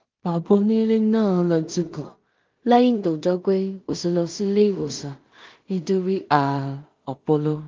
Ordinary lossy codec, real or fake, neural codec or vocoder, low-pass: Opus, 32 kbps; fake; codec, 16 kHz in and 24 kHz out, 0.4 kbps, LongCat-Audio-Codec, two codebook decoder; 7.2 kHz